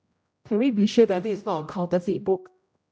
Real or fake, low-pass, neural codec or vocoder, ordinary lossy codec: fake; none; codec, 16 kHz, 0.5 kbps, X-Codec, HuBERT features, trained on general audio; none